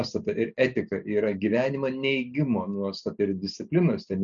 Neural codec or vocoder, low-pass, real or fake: none; 7.2 kHz; real